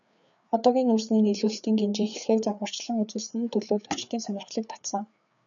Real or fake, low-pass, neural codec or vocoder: fake; 7.2 kHz; codec, 16 kHz, 4 kbps, FreqCodec, larger model